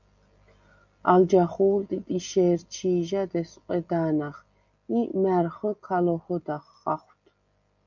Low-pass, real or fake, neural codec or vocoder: 7.2 kHz; real; none